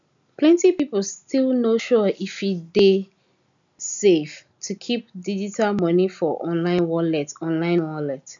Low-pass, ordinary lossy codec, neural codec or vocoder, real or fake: 7.2 kHz; none; none; real